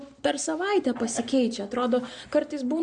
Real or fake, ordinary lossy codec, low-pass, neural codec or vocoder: real; MP3, 96 kbps; 9.9 kHz; none